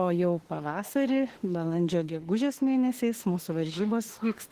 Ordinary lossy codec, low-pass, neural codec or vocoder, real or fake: Opus, 16 kbps; 14.4 kHz; autoencoder, 48 kHz, 32 numbers a frame, DAC-VAE, trained on Japanese speech; fake